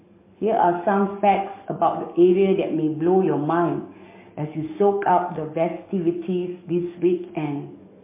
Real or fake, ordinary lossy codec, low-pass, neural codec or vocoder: fake; MP3, 24 kbps; 3.6 kHz; codec, 44.1 kHz, 7.8 kbps, DAC